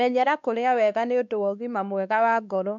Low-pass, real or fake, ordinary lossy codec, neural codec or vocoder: 7.2 kHz; fake; none; codec, 16 kHz, 2 kbps, X-Codec, WavLM features, trained on Multilingual LibriSpeech